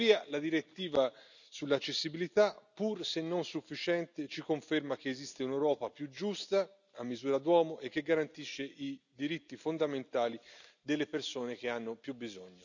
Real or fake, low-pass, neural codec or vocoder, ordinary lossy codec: real; 7.2 kHz; none; none